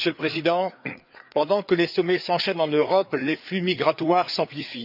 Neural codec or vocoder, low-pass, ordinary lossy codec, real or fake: codec, 16 kHz, 4 kbps, FreqCodec, larger model; 5.4 kHz; none; fake